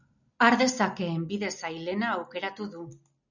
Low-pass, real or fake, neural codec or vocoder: 7.2 kHz; real; none